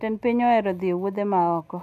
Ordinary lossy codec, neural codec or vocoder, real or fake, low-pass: AAC, 96 kbps; none; real; 14.4 kHz